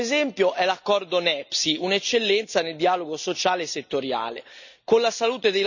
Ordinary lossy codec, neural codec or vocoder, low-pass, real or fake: none; none; 7.2 kHz; real